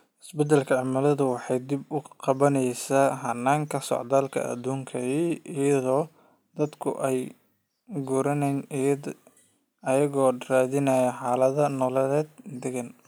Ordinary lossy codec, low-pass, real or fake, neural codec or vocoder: none; none; real; none